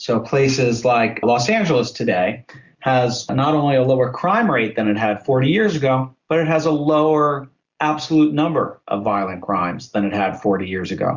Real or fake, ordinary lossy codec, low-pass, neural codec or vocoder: real; Opus, 64 kbps; 7.2 kHz; none